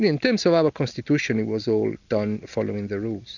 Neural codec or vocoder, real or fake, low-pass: none; real; 7.2 kHz